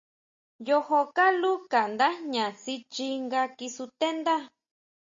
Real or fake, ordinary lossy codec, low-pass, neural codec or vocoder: real; MP3, 32 kbps; 7.2 kHz; none